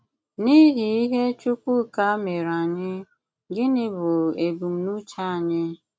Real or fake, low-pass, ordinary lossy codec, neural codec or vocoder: real; none; none; none